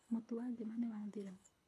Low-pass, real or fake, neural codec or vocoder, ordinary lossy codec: none; fake; codec, 24 kHz, 6 kbps, HILCodec; none